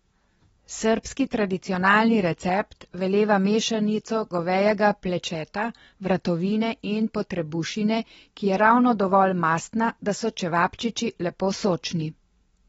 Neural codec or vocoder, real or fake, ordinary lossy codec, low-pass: none; real; AAC, 24 kbps; 9.9 kHz